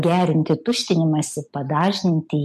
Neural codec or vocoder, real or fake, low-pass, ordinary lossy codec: none; real; 14.4 kHz; MP3, 64 kbps